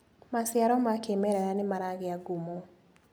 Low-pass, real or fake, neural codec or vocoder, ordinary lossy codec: none; real; none; none